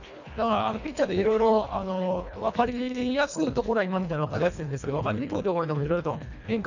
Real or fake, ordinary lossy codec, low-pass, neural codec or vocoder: fake; none; 7.2 kHz; codec, 24 kHz, 1.5 kbps, HILCodec